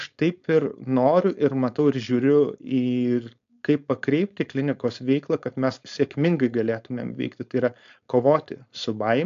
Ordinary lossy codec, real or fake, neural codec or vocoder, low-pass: AAC, 64 kbps; fake; codec, 16 kHz, 4.8 kbps, FACodec; 7.2 kHz